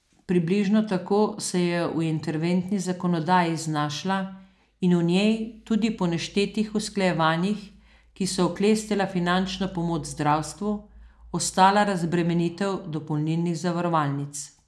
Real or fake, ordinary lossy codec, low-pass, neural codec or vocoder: real; none; none; none